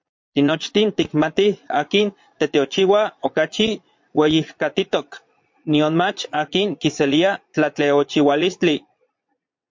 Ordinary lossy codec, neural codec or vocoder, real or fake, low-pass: MP3, 48 kbps; vocoder, 22.05 kHz, 80 mel bands, Vocos; fake; 7.2 kHz